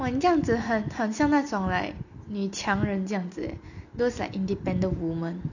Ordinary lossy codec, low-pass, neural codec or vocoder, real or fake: none; 7.2 kHz; none; real